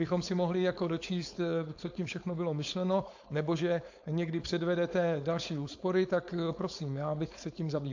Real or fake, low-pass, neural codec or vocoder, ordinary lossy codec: fake; 7.2 kHz; codec, 16 kHz, 4.8 kbps, FACodec; AAC, 48 kbps